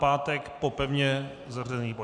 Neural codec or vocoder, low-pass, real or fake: none; 9.9 kHz; real